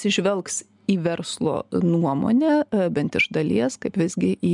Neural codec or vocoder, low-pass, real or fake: none; 10.8 kHz; real